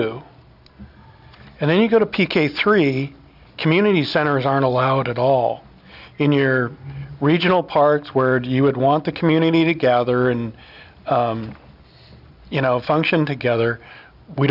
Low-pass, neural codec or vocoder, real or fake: 5.4 kHz; none; real